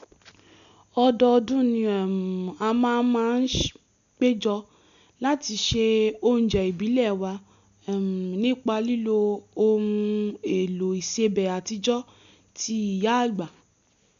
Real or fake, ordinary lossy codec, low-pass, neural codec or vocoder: real; none; 7.2 kHz; none